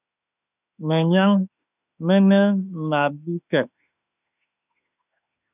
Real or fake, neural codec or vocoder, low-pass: fake; autoencoder, 48 kHz, 32 numbers a frame, DAC-VAE, trained on Japanese speech; 3.6 kHz